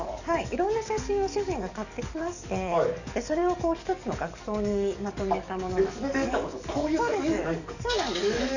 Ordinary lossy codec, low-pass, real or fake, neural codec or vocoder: none; 7.2 kHz; fake; codec, 44.1 kHz, 7.8 kbps, DAC